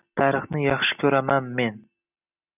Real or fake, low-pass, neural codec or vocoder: real; 3.6 kHz; none